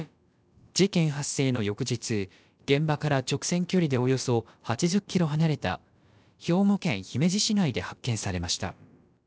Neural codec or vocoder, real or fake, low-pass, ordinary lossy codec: codec, 16 kHz, about 1 kbps, DyCAST, with the encoder's durations; fake; none; none